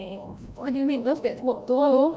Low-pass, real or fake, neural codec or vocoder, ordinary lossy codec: none; fake; codec, 16 kHz, 0.5 kbps, FreqCodec, larger model; none